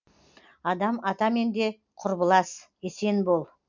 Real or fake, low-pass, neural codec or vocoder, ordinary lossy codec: real; 7.2 kHz; none; MP3, 64 kbps